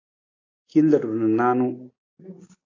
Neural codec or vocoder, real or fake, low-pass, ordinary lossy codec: none; real; 7.2 kHz; AAC, 32 kbps